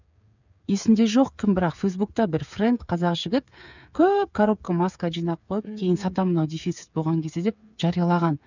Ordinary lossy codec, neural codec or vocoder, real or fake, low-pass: none; codec, 16 kHz, 8 kbps, FreqCodec, smaller model; fake; 7.2 kHz